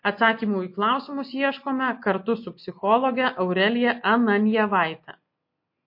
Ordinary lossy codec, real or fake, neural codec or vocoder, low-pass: MP3, 32 kbps; real; none; 5.4 kHz